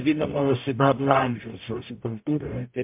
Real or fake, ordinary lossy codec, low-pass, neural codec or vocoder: fake; MP3, 32 kbps; 3.6 kHz; codec, 44.1 kHz, 0.9 kbps, DAC